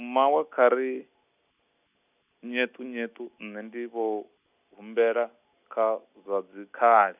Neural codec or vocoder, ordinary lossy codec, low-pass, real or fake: none; none; 3.6 kHz; real